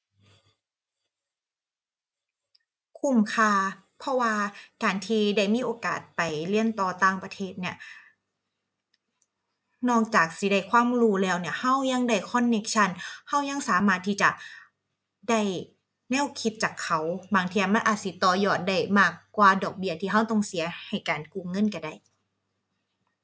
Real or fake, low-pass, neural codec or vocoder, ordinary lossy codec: real; none; none; none